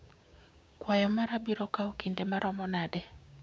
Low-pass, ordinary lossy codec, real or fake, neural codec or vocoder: none; none; fake; codec, 16 kHz, 6 kbps, DAC